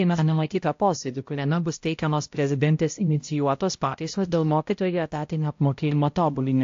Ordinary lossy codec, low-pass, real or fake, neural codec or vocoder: AAC, 48 kbps; 7.2 kHz; fake; codec, 16 kHz, 0.5 kbps, X-Codec, HuBERT features, trained on balanced general audio